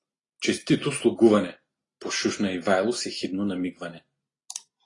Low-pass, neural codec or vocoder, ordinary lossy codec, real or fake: 10.8 kHz; none; AAC, 32 kbps; real